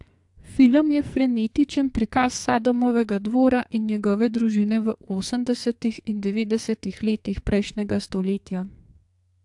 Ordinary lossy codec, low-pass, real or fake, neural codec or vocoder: AAC, 64 kbps; 10.8 kHz; fake; codec, 44.1 kHz, 2.6 kbps, SNAC